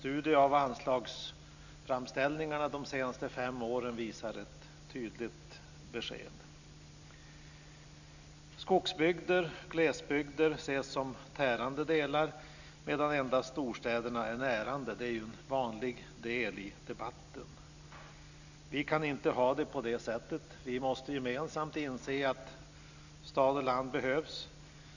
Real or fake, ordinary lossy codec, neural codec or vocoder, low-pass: real; none; none; 7.2 kHz